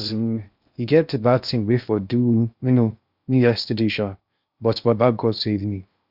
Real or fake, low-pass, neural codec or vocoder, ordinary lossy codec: fake; 5.4 kHz; codec, 16 kHz in and 24 kHz out, 0.6 kbps, FocalCodec, streaming, 2048 codes; Opus, 64 kbps